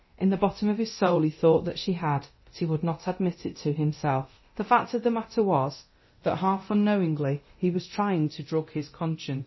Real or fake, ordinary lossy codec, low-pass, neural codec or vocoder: fake; MP3, 24 kbps; 7.2 kHz; codec, 24 kHz, 0.9 kbps, DualCodec